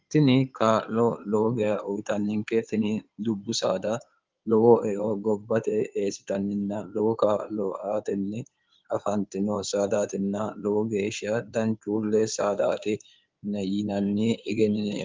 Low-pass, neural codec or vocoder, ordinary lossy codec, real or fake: 7.2 kHz; codec, 16 kHz in and 24 kHz out, 2.2 kbps, FireRedTTS-2 codec; Opus, 32 kbps; fake